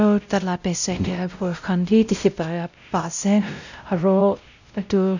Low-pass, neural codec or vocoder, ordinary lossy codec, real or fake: 7.2 kHz; codec, 16 kHz, 0.5 kbps, X-Codec, WavLM features, trained on Multilingual LibriSpeech; none; fake